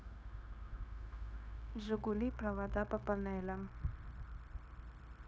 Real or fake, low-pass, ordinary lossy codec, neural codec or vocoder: fake; none; none; codec, 16 kHz, 0.9 kbps, LongCat-Audio-Codec